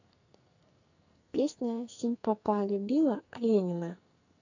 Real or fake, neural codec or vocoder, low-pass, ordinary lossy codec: fake; codec, 44.1 kHz, 2.6 kbps, SNAC; 7.2 kHz; none